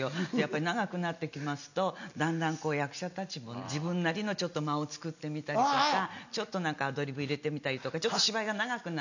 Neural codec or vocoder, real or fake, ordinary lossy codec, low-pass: none; real; none; 7.2 kHz